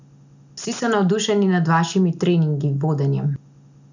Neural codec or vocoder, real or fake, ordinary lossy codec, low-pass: none; real; none; 7.2 kHz